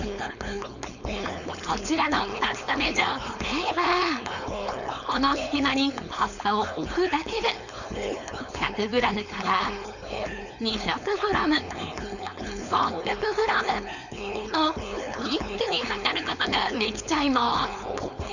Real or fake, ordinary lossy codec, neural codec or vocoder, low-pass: fake; none; codec, 16 kHz, 4.8 kbps, FACodec; 7.2 kHz